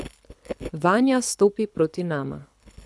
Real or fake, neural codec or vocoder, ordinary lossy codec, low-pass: fake; codec, 24 kHz, 6 kbps, HILCodec; none; none